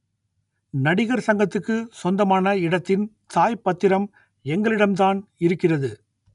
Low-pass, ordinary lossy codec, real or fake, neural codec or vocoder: 10.8 kHz; none; real; none